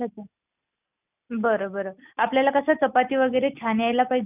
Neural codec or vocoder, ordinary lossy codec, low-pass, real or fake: none; none; 3.6 kHz; real